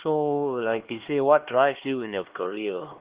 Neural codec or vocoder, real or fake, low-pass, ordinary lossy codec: codec, 16 kHz, 2 kbps, X-Codec, HuBERT features, trained on LibriSpeech; fake; 3.6 kHz; Opus, 16 kbps